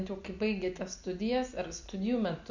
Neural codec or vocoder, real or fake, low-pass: none; real; 7.2 kHz